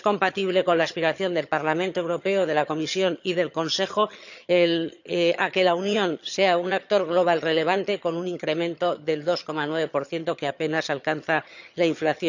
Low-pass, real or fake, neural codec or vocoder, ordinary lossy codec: 7.2 kHz; fake; vocoder, 22.05 kHz, 80 mel bands, HiFi-GAN; none